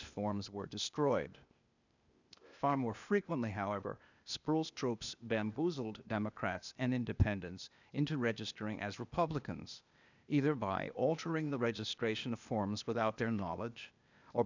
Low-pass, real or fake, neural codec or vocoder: 7.2 kHz; fake; codec, 16 kHz, 0.8 kbps, ZipCodec